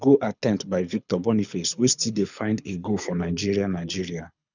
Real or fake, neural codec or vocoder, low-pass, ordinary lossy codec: fake; codec, 24 kHz, 6 kbps, HILCodec; 7.2 kHz; none